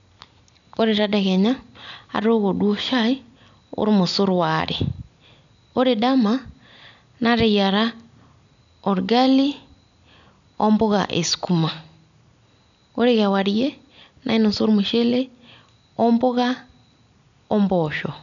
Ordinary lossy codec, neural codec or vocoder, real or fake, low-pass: none; none; real; 7.2 kHz